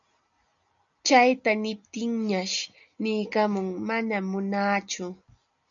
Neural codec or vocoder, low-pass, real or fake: none; 7.2 kHz; real